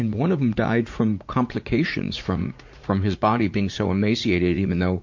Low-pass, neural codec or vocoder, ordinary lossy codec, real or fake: 7.2 kHz; vocoder, 44.1 kHz, 80 mel bands, Vocos; MP3, 48 kbps; fake